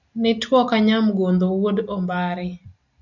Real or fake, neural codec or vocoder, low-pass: real; none; 7.2 kHz